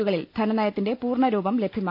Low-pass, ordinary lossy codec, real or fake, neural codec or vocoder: 5.4 kHz; none; real; none